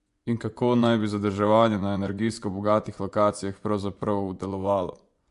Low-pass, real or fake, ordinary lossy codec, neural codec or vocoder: 10.8 kHz; fake; MP3, 64 kbps; vocoder, 24 kHz, 100 mel bands, Vocos